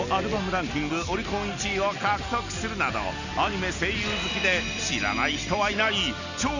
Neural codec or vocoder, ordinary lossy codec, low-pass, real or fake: none; none; 7.2 kHz; real